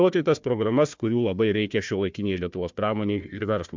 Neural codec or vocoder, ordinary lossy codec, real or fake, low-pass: codec, 16 kHz, 1 kbps, FunCodec, trained on Chinese and English, 50 frames a second; MP3, 64 kbps; fake; 7.2 kHz